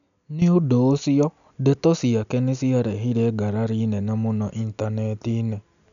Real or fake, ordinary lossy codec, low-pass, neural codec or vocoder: real; none; 7.2 kHz; none